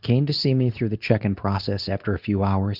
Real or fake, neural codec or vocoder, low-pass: fake; codec, 24 kHz, 0.9 kbps, WavTokenizer, medium speech release version 2; 5.4 kHz